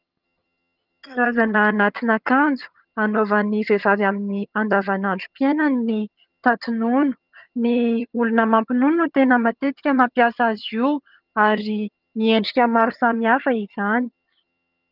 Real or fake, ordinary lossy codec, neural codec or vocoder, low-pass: fake; Opus, 24 kbps; vocoder, 22.05 kHz, 80 mel bands, HiFi-GAN; 5.4 kHz